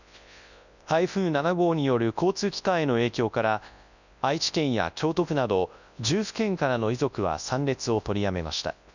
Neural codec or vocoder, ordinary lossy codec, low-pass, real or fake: codec, 24 kHz, 0.9 kbps, WavTokenizer, large speech release; none; 7.2 kHz; fake